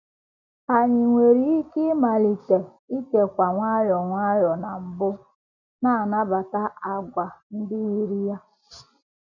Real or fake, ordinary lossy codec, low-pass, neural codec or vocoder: real; none; 7.2 kHz; none